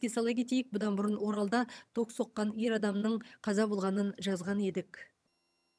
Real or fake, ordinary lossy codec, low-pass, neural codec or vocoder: fake; none; none; vocoder, 22.05 kHz, 80 mel bands, HiFi-GAN